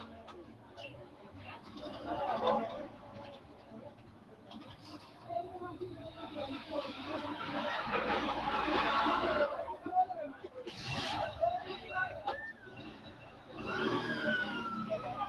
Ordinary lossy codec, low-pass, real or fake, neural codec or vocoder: Opus, 24 kbps; 14.4 kHz; fake; autoencoder, 48 kHz, 128 numbers a frame, DAC-VAE, trained on Japanese speech